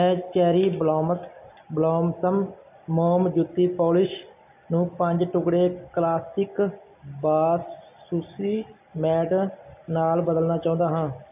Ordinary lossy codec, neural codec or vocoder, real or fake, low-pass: none; none; real; 3.6 kHz